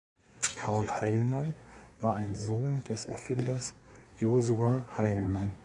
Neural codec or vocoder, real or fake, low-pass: codec, 24 kHz, 1 kbps, SNAC; fake; 10.8 kHz